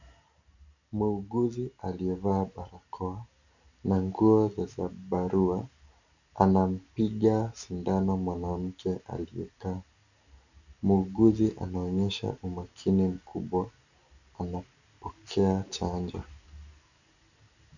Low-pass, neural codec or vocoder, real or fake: 7.2 kHz; none; real